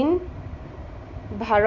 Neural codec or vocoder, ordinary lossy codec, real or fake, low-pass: none; none; real; 7.2 kHz